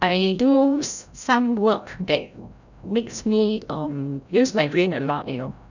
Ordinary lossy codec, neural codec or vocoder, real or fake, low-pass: none; codec, 16 kHz, 0.5 kbps, FreqCodec, larger model; fake; 7.2 kHz